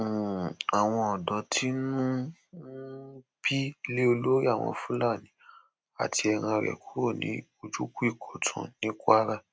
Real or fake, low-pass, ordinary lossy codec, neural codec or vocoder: real; none; none; none